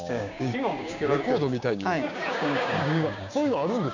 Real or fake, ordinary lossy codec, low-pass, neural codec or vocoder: fake; none; 7.2 kHz; codec, 16 kHz, 6 kbps, DAC